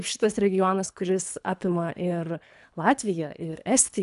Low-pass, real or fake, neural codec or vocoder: 10.8 kHz; fake; codec, 24 kHz, 3 kbps, HILCodec